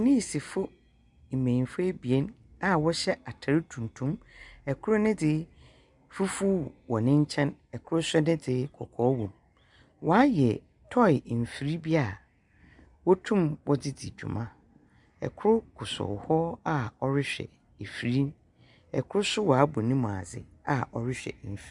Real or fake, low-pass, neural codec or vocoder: real; 10.8 kHz; none